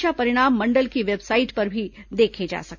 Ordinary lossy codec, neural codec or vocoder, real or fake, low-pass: none; none; real; 7.2 kHz